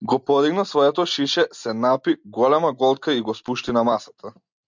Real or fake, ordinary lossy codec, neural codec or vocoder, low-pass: real; MP3, 64 kbps; none; 7.2 kHz